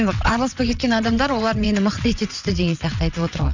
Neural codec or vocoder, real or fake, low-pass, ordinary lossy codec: vocoder, 22.05 kHz, 80 mel bands, WaveNeXt; fake; 7.2 kHz; none